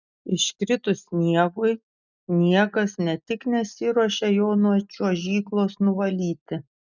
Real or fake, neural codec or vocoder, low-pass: real; none; 7.2 kHz